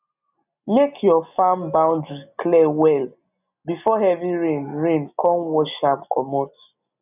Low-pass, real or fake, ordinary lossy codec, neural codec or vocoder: 3.6 kHz; real; none; none